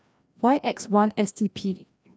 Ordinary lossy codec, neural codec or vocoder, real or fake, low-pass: none; codec, 16 kHz, 1 kbps, FreqCodec, larger model; fake; none